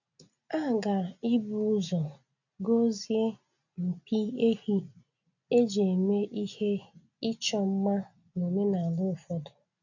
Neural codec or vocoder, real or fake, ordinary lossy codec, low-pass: none; real; none; 7.2 kHz